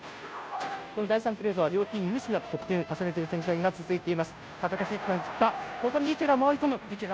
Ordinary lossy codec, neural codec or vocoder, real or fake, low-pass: none; codec, 16 kHz, 0.5 kbps, FunCodec, trained on Chinese and English, 25 frames a second; fake; none